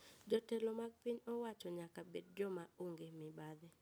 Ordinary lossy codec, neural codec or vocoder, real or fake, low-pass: none; none; real; none